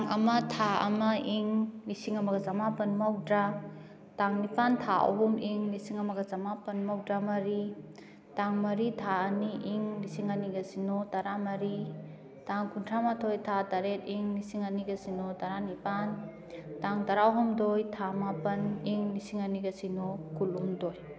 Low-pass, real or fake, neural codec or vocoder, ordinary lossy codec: none; real; none; none